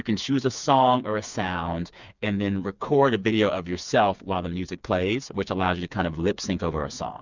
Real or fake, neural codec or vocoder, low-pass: fake; codec, 16 kHz, 4 kbps, FreqCodec, smaller model; 7.2 kHz